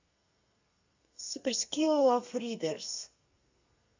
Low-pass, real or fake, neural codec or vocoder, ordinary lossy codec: 7.2 kHz; fake; codec, 32 kHz, 1.9 kbps, SNAC; AAC, 48 kbps